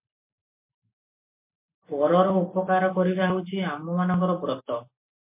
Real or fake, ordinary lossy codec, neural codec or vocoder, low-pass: real; MP3, 24 kbps; none; 3.6 kHz